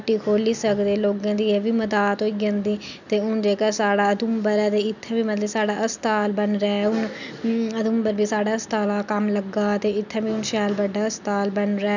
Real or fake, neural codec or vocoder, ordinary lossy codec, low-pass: real; none; none; 7.2 kHz